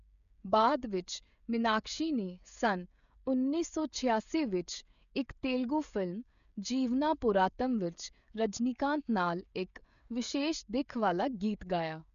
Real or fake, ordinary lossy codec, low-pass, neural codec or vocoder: fake; none; 7.2 kHz; codec, 16 kHz, 16 kbps, FreqCodec, smaller model